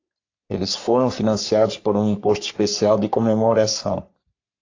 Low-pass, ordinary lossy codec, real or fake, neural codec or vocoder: 7.2 kHz; AAC, 48 kbps; fake; codec, 44.1 kHz, 3.4 kbps, Pupu-Codec